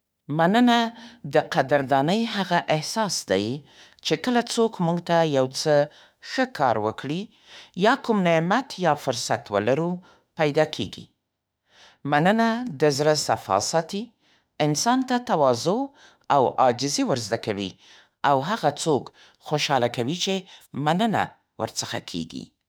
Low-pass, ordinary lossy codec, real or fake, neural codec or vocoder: none; none; fake; autoencoder, 48 kHz, 32 numbers a frame, DAC-VAE, trained on Japanese speech